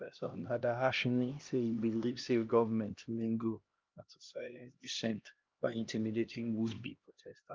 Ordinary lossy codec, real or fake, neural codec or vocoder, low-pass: Opus, 24 kbps; fake; codec, 16 kHz, 1 kbps, X-Codec, HuBERT features, trained on LibriSpeech; 7.2 kHz